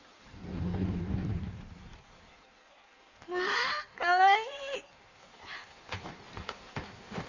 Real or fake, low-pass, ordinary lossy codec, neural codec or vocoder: fake; 7.2 kHz; none; codec, 16 kHz in and 24 kHz out, 1.1 kbps, FireRedTTS-2 codec